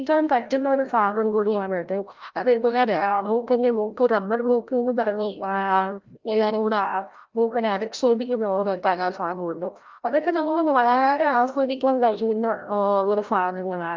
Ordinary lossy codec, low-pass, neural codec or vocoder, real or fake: Opus, 32 kbps; 7.2 kHz; codec, 16 kHz, 0.5 kbps, FreqCodec, larger model; fake